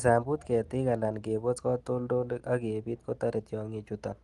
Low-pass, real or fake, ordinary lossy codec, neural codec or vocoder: 10.8 kHz; real; Opus, 24 kbps; none